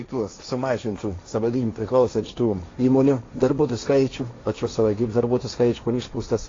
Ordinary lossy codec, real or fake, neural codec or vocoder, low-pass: AAC, 32 kbps; fake; codec, 16 kHz, 1.1 kbps, Voila-Tokenizer; 7.2 kHz